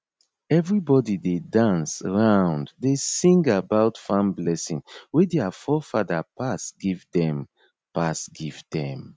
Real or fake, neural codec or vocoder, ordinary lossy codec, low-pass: real; none; none; none